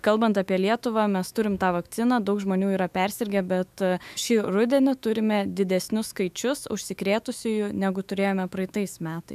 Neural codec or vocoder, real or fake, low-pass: autoencoder, 48 kHz, 128 numbers a frame, DAC-VAE, trained on Japanese speech; fake; 14.4 kHz